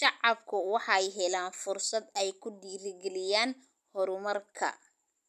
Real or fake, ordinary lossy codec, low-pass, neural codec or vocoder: real; none; 14.4 kHz; none